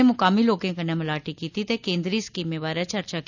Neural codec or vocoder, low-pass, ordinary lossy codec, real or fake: none; 7.2 kHz; none; real